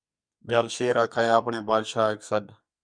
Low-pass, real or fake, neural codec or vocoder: 9.9 kHz; fake; codec, 44.1 kHz, 2.6 kbps, SNAC